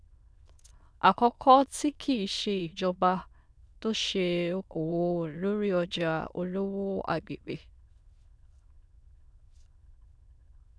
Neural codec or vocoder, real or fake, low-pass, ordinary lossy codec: autoencoder, 22.05 kHz, a latent of 192 numbers a frame, VITS, trained on many speakers; fake; none; none